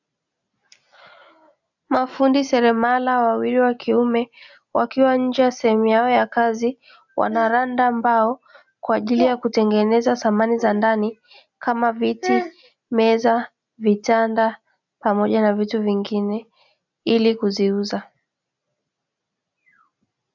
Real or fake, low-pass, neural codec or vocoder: real; 7.2 kHz; none